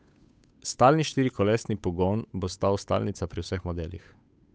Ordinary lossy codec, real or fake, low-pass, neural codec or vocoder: none; fake; none; codec, 16 kHz, 8 kbps, FunCodec, trained on Chinese and English, 25 frames a second